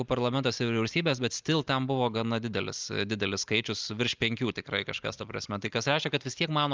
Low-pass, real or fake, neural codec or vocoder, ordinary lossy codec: 7.2 kHz; real; none; Opus, 24 kbps